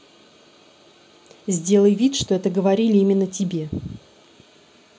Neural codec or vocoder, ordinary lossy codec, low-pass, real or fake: none; none; none; real